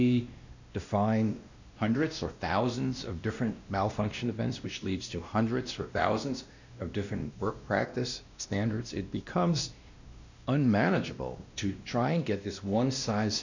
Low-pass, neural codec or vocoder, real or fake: 7.2 kHz; codec, 16 kHz, 1 kbps, X-Codec, WavLM features, trained on Multilingual LibriSpeech; fake